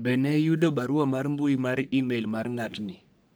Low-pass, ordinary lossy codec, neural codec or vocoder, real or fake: none; none; codec, 44.1 kHz, 3.4 kbps, Pupu-Codec; fake